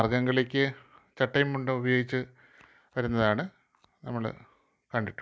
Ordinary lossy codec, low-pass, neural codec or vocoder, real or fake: none; none; none; real